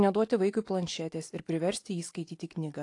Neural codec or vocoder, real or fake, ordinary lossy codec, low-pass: none; real; AAC, 48 kbps; 10.8 kHz